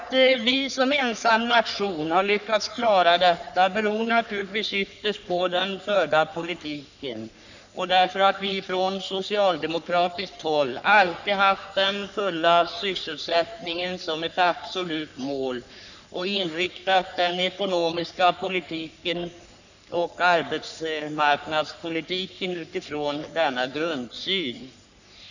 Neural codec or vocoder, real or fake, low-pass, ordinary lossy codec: codec, 44.1 kHz, 3.4 kbps, Pupu-Codec; fake; 7.2 kHz; none